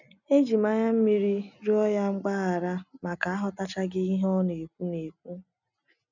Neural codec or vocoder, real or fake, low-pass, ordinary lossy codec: none; real; 7.2 kHz; none